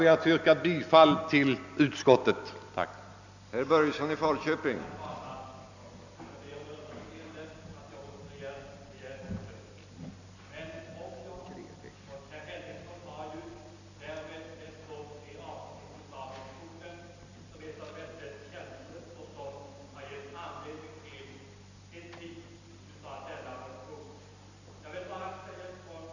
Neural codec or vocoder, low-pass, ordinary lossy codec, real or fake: none; 7.2 kHz; none; real